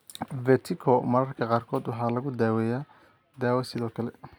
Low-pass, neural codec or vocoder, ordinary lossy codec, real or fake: none; none; none; real